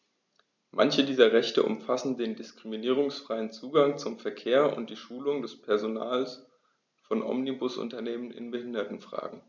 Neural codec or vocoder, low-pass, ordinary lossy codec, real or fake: none; 7.2 kHz; none; real